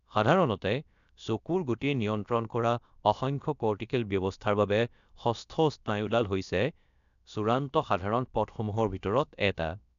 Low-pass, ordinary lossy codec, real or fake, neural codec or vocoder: 7.2 kHz; none; fake; codec, 16 kHz, about 1 kbps, DyCAST, with the encoder's durations